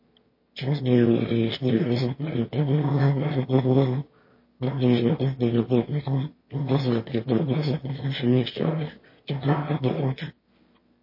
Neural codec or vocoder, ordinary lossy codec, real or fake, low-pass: autoencoder, 22.05 kHz, a latent of 192 numbers a frame, VITS, trained on one speaker; MP3, 24 kbps; fake; 5.4 kHz